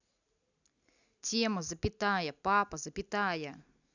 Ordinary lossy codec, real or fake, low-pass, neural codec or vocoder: none; real; 7.2 kHz; none